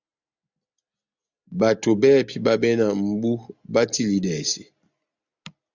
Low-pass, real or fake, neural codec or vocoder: 7.2 kHz; real; none